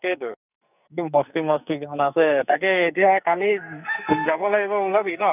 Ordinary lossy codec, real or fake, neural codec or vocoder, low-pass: none; fake; codec, 44.1 kHz, 2.6 kbps, SNAC; 3.6 kHz